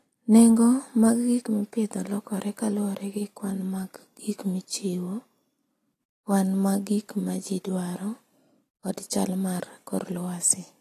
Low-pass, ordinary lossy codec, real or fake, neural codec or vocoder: 14.4 kHz; AAC, 48 kbps; real; none